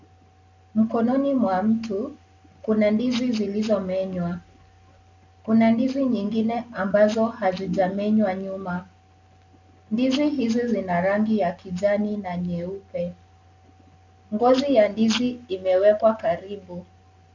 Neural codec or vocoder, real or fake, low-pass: none; real; 7.2 kHz